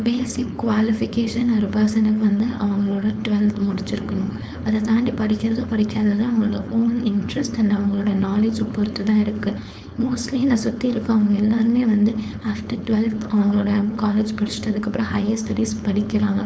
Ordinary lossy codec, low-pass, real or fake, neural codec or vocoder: none; none; fake; codec, 16 kHz, 4.8 kbps, FACodec